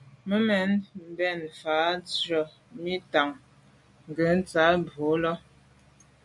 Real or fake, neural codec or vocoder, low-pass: real; none; 10.8 kHz